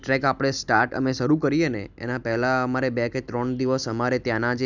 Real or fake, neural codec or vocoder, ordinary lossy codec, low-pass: real; none; none; 7.2 kHz